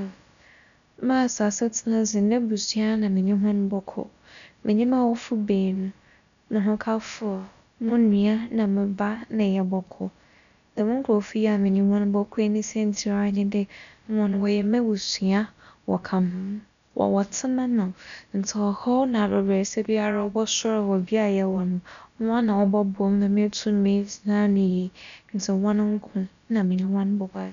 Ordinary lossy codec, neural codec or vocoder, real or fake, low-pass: none; codec, 16 kHz, about 1 kbps, DyCAST, with the encoder's durations; fake; 7.2 kHz